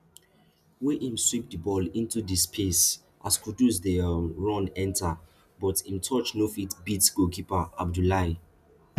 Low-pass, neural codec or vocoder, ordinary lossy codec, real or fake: 14.4 kHz; none; none; real